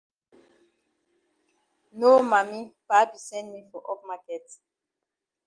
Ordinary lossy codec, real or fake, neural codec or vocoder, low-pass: Opus, 24 kbps; real; none; 9.9 kHz